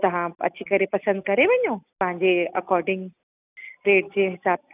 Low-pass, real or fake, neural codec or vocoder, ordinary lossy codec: 3.6 kHz; real; none; none